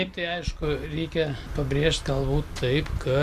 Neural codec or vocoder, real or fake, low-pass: none; real; 14.4 kHz